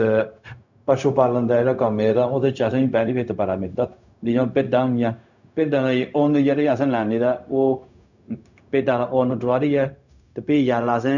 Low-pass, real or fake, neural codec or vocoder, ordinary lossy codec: 7.2 kHz; fake; codec, 16 kHz, 0.4 kbps, LongCat-Audio-Codec; none